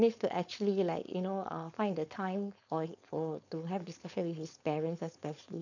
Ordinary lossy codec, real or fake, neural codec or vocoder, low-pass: none; fake; codec, 16 kHz, 4.8 kbps, FACodec; 7.2 kHz